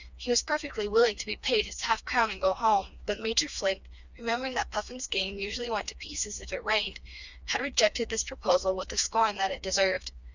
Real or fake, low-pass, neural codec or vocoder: fake; 7.2 kHz; codec, 16 kHz, 2 kbps, FreqCodec, smaller model